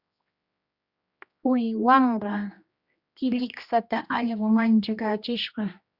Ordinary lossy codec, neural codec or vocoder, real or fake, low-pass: Opus, 64 kbps; codec, 16 kHz, 1 kbps, X-Codec, HuBERT features, trained on general audio; fake; 5.4 kHz